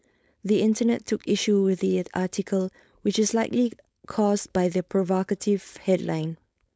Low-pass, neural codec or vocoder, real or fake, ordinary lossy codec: none; codec, 16 kHz, 4.8 kbps, FACodec; fake; none